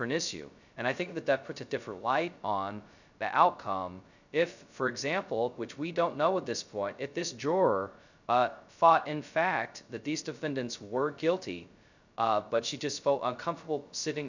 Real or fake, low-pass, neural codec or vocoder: fake; 7.2 kHz; codec, 16 kHz, 0.2 kbps, FocalCodec